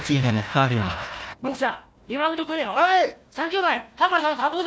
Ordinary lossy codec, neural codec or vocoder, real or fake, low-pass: none; codec, 16 kHz, 1 kbps, FunCodec, trained on Chinese and English, 50 frames a second; fake; none